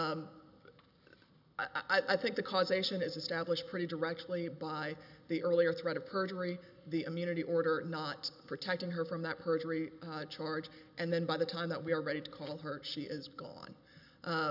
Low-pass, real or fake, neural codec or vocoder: 5.4 kHz; real; none